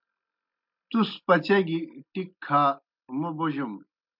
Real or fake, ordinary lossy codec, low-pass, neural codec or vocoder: real; MP3, 48 kbps; 5.4 kHz; none